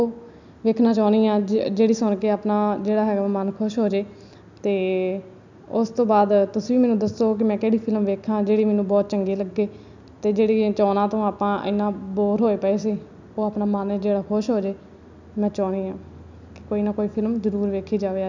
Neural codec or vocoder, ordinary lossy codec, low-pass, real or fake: none; none; 7.2 kHz; real